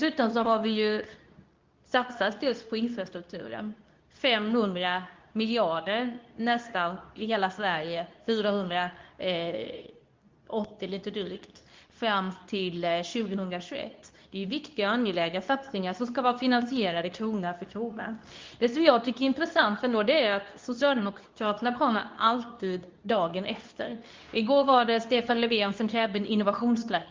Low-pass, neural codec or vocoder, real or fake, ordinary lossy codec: 7.2 kHz; codec, 24 kHz, 0.9 kbps, WavTokenizer, medium speech release version 2; fake; Opus, 24 kbps